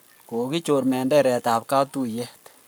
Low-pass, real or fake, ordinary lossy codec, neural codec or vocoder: none; fake; none; codec, 44.1 kHz, 7.8 kbps, Pupu-Codec